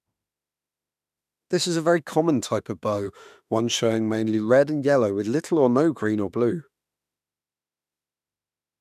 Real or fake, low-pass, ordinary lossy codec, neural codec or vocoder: fake; 14.4 kHz; none; autoencoder, 48 kHz, 32 numbers a frame, DAC-VAE, trained on Japanese speech